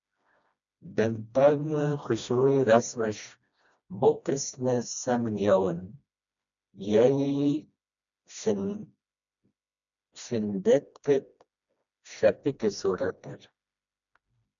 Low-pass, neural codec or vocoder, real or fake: 7.2 kHz; codec, 16 kHz, 1 kbps, FreqCodec, smaller model; fake